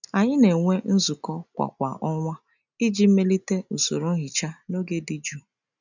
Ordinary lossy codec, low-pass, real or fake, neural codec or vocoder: none; 7.2 kHz; real; none